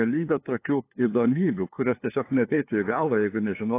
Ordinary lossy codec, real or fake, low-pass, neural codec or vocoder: AAC, 24 kbps; fake; 3.6 kHz; codec, 16 kHz, 2 kbps, FunCodec, trained on LibriTTS, 25 frames a second